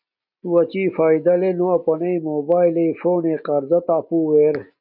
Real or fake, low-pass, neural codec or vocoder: real; 5.4 kHz; none